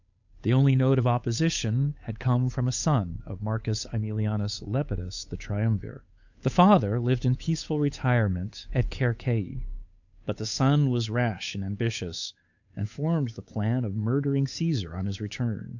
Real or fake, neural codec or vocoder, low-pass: fake; codec, 16 kHz, 8 kbps, FunCodec, trained on Chinese and English, 25 frames a second; 7.2 kHz